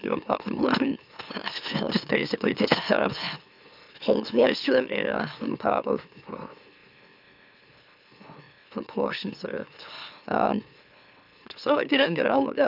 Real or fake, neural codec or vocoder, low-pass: fake; autoencoder, 44.1 kHz, a latent of 192 numbers a frame, MeloTTS; 5.4 kHz